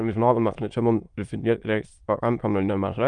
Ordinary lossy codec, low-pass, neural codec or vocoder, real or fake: Opus, 32 kbps; 9.9 kHz; autoencoder, 22.05 kHz, a latent of 192 numbers a frame, VITS, trained on many speakers; fake